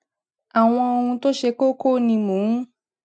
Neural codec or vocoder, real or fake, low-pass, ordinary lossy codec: none; real; 9.9 kHz; none